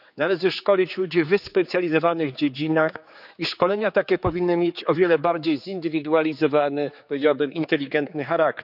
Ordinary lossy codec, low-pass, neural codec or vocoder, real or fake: none; 5.4 kHz; codec, 16 kHz, 4 kbps, X-Codec, HuBERT features, trained on general audio; fake